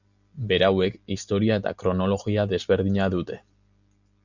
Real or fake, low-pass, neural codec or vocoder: real; 7.2 kHz; none